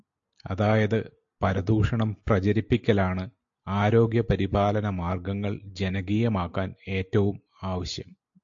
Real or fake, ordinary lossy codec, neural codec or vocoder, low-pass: real; AAC, 64 kbps; none; 7.2 kHz